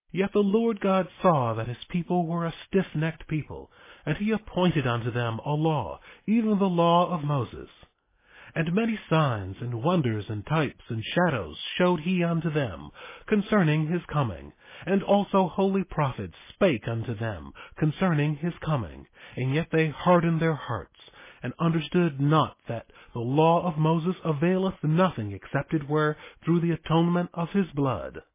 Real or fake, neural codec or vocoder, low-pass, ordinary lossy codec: real; none; 3.6 kHz; MP3, 16 kbps